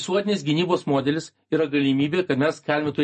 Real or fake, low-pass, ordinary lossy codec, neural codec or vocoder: real; 10.8 kHz; MP3, 32 kbps; none